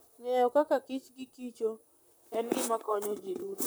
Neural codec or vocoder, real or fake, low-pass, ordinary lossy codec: vocoder, 44.1 kHz, 128 mel bands, Pupu-Vocoder; fake; none; none